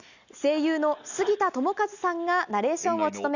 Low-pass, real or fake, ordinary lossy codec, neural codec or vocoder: 7.2 kHz; real; none; none